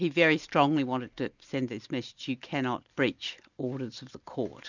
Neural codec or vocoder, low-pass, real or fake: none; 7.2 kHz; real